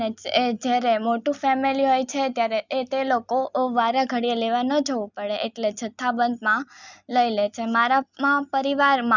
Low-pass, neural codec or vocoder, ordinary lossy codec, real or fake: 7.2 kHz; none; none; real